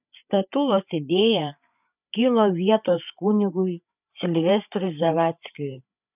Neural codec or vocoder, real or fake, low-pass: codec, 16 kHz, 8 kbps, FreqCodec, larger model; fake; 3.6 kHz